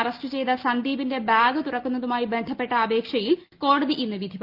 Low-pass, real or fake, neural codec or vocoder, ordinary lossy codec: 5.4 kHz; real; none; Opus, 32 kbps